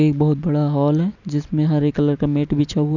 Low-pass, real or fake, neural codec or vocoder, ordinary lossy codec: 7.2 kHz; real; none; none